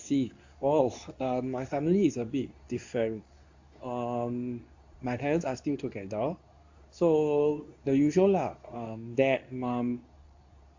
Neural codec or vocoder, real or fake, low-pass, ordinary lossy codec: codec, 24 kHz, 0.9 kbps, WavTokenizer, medium speech release version 2; fake; 7.2 kHz; AAC, 48 kbps